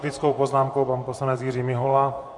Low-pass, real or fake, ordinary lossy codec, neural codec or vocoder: 10.8 kHz; fake; MP3, 64 kbps; vocoder, 24 kHz, 100 mel bands, Vocos